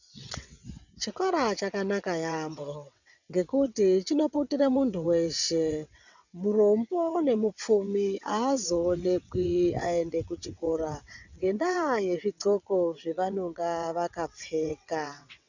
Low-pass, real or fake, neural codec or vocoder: 7.2 kHz; fake; vocoder, 22.05 kHz, 80 mel bands, WaveNeXt